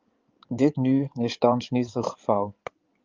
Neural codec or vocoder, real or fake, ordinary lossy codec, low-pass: codec, 16 kHz in and 24 kHz out, 2.2 kbps, FireRedTTS-2 codec; fake; Opus, 24 kbps; 7.2 kHz